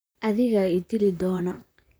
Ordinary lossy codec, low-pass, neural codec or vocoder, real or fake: none; none; vocoder, 44.1 kHz, 128 mel bands, Pupu-Vocoder; fake